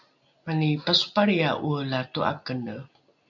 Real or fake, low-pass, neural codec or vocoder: real; 7.2 kHz; none